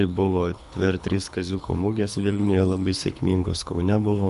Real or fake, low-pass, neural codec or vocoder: fake; 10.8 kHz; codec, 24 kHz, 3 kbps, HILCodec